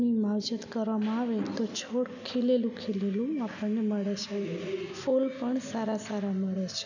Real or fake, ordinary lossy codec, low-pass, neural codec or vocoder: real; none; 7.2 kHz; none